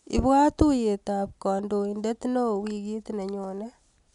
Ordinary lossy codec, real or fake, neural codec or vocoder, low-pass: none; real; none; 10.8 kHz